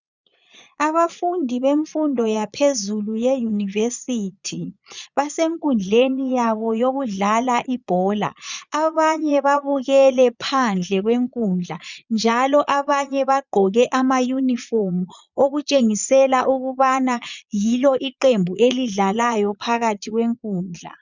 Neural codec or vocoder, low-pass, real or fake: vocoder, 22.05 kHz, 80 mel bands, Vocos; 7.2 kHz; fake